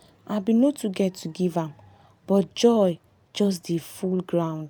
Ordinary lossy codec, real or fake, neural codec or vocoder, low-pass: none; fake; vocoder, 44.1 kHz, 128 mel bands every 512 samples, BigVGAN v2; 19.8 kHz